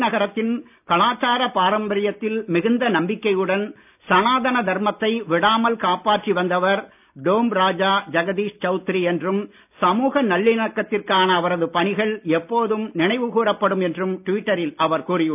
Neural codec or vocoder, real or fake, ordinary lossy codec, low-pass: none; real; none; 3.6 kHz